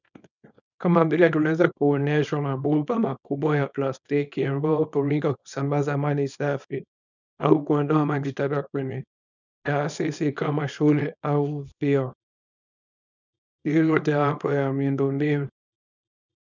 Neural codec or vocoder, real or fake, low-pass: codec, 24 kHz, 0.9 kbps, WavTokenizer, small release; fake; 7.2 kHz